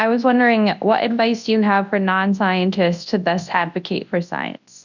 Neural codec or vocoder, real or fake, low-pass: codec, 24 kHz, 0.9 kbps, WavTokenizer, large speech release; fake; 7.2 kHz